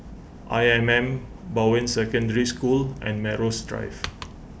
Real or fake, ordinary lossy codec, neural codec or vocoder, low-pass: real; none; none; none